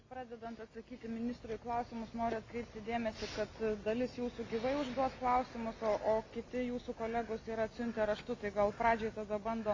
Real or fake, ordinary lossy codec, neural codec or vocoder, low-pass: real; MP3, 48 kbps; none; 7.2 kHz